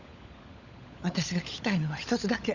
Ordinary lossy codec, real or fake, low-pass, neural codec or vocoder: Opus, 64 kbps; fake; 7.2 kHz; codec, 16 kHz, 16 kbps, FunCodec, trained on LibriTTS, 50 frames a second